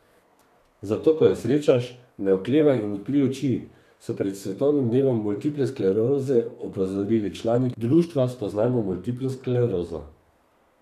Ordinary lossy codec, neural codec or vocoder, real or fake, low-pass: none; codec, 32 kHz, 1.9 kbps, SNAC; fake; 14.4 kHz